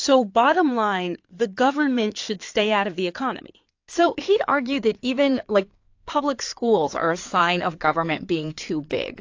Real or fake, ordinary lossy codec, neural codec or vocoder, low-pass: fake; AAC, 48 kbps; codec, 16 kHz in and 24 kHz out, 2.2 kbps, FireRedTTS-2 codec; 7.2 kHz